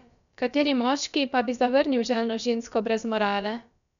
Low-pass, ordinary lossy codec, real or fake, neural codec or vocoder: 7.2 kHz; none; fake; codec, 16 kHz, about 1 kbps, DyCAST, with the encoder's durations